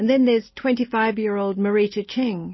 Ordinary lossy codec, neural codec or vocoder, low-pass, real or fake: MP3, 24 kbps; none; 7.2 kHz; real